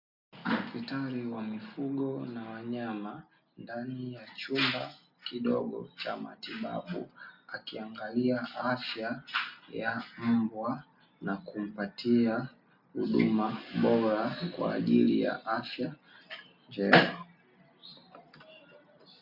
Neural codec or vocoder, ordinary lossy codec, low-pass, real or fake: none; MP3, 48 kbps; 5.4 kHz; real